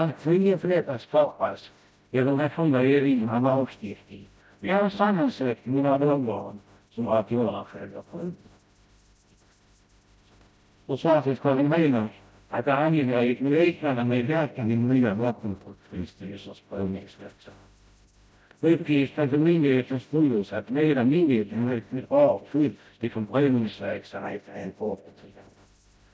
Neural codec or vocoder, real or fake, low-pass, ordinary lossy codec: codec, 16 kHz, 0.5 kbps, FreqCodec, smaller model; fake; none; none